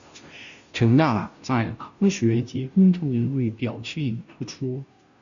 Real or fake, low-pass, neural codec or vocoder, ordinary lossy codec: fake; 7.2 kHz; codec, 16 kHz, 0.5 kbps, FunCodec, trained on Chinese and English, 25 frames a second; MP3, 96 kbps